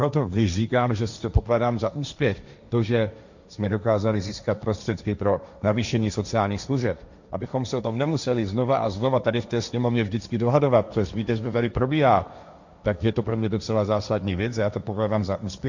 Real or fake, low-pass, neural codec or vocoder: fake; 7.2 kHz; codec, 16 kHz, 1.1 kbps, Voila-Tokenizer